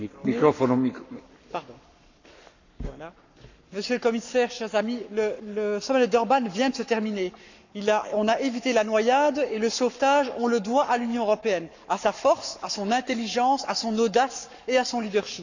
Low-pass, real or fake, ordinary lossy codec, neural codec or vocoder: 7.2 kHz; fake; none; codec, 44.1 kHz, 7.8 kbps, Pupu-Codec